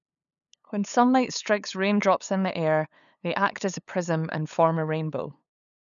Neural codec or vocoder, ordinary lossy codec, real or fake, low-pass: codec, 16 kHz, 8 kbps, FunCodec, trained on LibriTTS, 25 frames a second; none; fake; 7.2 kHz